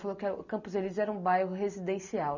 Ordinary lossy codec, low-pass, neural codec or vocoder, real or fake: none; 7.2 kHz; none; real